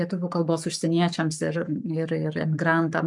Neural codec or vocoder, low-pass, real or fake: codec, 44.1 kHz, 7.8 kbps, Pupu-Codec; 10.8 kHz; fake